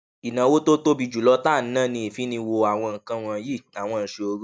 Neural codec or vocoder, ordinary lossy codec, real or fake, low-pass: none; none; real; none